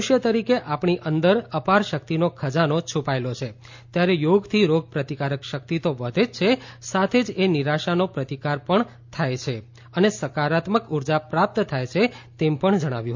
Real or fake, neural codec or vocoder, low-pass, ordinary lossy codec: real; none; 7.2 kHz; MP3, 48 kbps